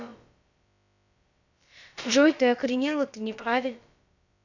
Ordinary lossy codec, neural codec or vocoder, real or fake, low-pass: none; codec, 16 kHz, about 1 kbps, DyCAST, with the encoder's durations; fake; 7.2 kHz